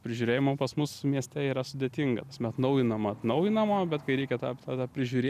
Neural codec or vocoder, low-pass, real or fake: none; 14.4 kHz; real